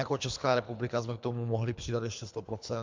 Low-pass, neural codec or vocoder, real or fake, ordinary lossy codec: 7.2 kHz; codec, 24 kHz, 3 kbps, HILCodec; fake; MP3, 64 kbps